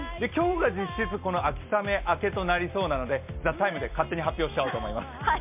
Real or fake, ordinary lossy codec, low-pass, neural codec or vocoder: real; MP3, 32 kbps; 3.6 kHz; none